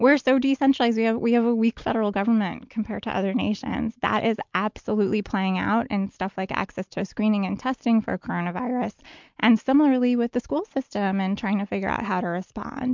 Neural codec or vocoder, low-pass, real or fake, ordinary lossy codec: none; 7.2 kHz; real; MP3, 64 kbps